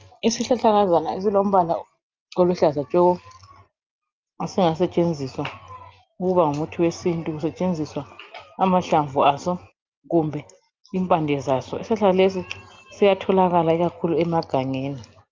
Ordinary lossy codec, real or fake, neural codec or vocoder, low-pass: Opus, 24 kbps; real; none; 7.2 kHz